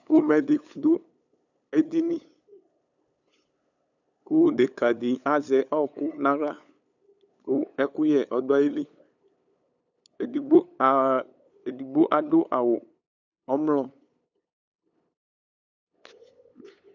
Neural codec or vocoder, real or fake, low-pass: codec, 16 kHz, 8 kbps, FunCodec, trained on LibriTTS, 25 frames a second; fake; 7.2 kHz